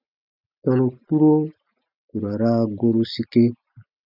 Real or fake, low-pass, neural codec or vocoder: real; 5.4 kHz; none